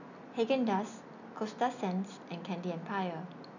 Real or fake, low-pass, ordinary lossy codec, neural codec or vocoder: real; 7.2 kHz; none; none